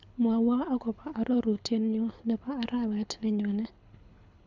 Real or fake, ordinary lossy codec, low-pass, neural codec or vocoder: fake; none; 7.2 kHz; codec, 24 kHz, 6 kbps, HILCodec